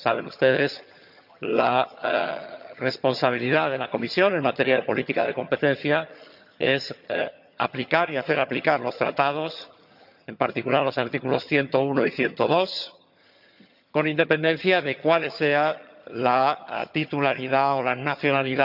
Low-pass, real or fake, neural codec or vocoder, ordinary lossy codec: 5.4 kHz; fake; vocoder, 22.05 kHz, 80 mel bands, HiFi-GAN; none